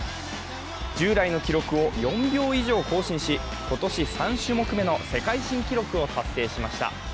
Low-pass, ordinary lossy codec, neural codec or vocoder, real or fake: none; none; none; real